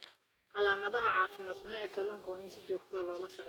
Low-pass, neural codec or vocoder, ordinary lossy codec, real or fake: 19.8 kHz; codec, 44.1 kHz, 2.6 kbps, DAC; none; fake